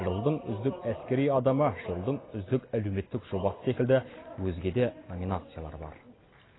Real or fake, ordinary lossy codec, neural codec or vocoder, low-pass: real; AAC, 16 kbps; none; 7.2 kHz